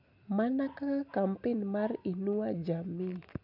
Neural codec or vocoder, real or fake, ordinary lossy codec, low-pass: none; real; none; 5.4 kHz